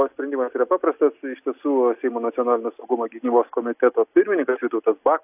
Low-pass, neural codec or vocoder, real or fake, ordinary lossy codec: 3.6 kHz; none; real; AAC, 32 kbps